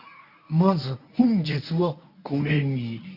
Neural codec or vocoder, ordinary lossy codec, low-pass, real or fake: codec, 24 kHz, 0.9 kbps, WavTokenizer, medium speech release version 1; none; 5.4 kHz; fake